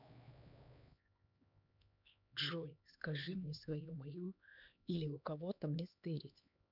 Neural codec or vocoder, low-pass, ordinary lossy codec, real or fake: codec, 16 kHz, 2 kbps, X-Codec, HuBERT features, trained on LibriSpeech; 5.4 kHz; none; fake